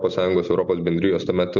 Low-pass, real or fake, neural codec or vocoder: 7.2 kHz; real; none